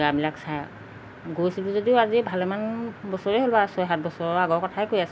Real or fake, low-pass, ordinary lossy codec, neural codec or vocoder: real; none; none; none